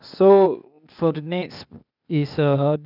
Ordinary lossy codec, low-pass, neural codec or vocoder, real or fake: none; 5.4 kHz; codec, 16 kHz, 0.8 kbps, ZipCodec; fake